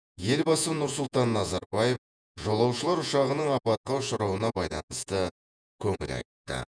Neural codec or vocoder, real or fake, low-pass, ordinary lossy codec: vocoder, 48 kHz, 128 mel bands, Vocos; fake; 9.9 kHz; none